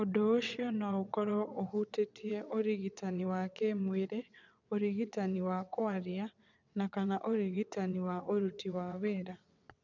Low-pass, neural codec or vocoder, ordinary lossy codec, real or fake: 7.2 kHz; vocoder, 22.05 kHz, 80 mel bands, WaveNeXt; none; fake